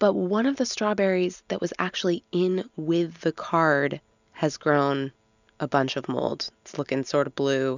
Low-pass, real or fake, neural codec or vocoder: 7.2 kHz; real; none